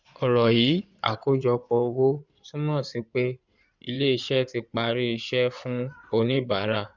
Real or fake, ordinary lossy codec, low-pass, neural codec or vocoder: fake; none; 7.2 kHz; codec, 16 kHz in and 24 kHz out, 2.2 kbps, FireRedTTS-2 codec